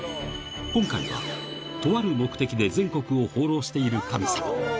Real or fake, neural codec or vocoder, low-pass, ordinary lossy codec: real; none; none; none